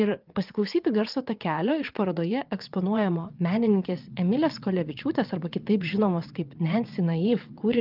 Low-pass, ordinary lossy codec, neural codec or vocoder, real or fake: 5.4 kHz; Opus, 24 kbps; vocoder, 22.05 kHz, 80 mel bands, WaveNeXt; fake